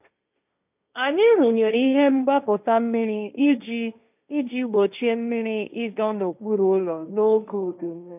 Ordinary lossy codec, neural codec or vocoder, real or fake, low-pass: none; codec, 16 kHz, 1.1 kbps, Voila-Tokenizer; fake; 3.6 kHz